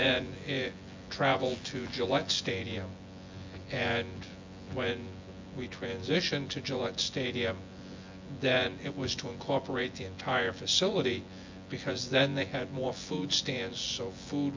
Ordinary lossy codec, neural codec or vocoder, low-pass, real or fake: MP3, 48 kbps; vocoder, 24 kHz, 100 mel bands, Vocos; 7.2 kHz; fake